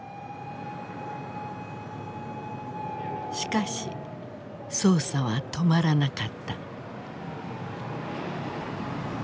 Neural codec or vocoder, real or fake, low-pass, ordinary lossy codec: none; real; none; none